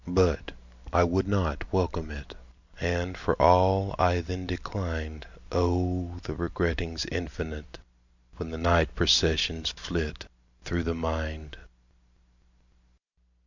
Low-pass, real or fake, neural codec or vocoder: 7.2 kHz; real; none